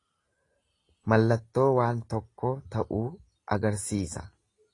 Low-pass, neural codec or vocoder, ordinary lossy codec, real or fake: 10.8 kHz; none; AAC, 32 kbps; real